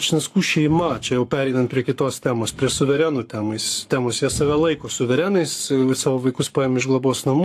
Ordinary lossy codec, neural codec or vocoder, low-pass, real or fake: AAC, 64 kbps; codec, 44.1 kHz, 7.8 kbps, Pupu-Codec; 14.4 kHz; fake